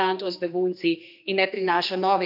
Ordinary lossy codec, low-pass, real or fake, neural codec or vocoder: none; 5.4 kHz; fake; codec, 16 kHz, 2 kbps, X-Codec, HuBERT features, trained on general audio